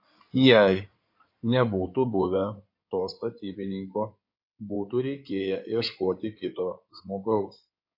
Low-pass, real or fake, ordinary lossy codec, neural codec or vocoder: 5.4 kHz; fake; MP3, 32 kbps; codec, 16 kHz in and 24 kHz out, 2.2 kbps, FireRedTTS-2 codec